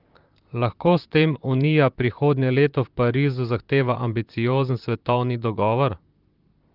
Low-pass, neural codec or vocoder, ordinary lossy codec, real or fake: 5.4 kHz; vocoder, 44.1 kHz, 128 mel bands, Pupu-Vocoder; Opus, 32 kbps; fake